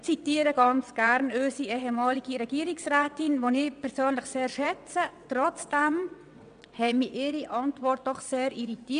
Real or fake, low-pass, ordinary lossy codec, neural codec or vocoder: fake; 9.9 kHz; MP3, 96 kbps; vocoder, 22.05 kHz, 80 mel bands, WaveNeXt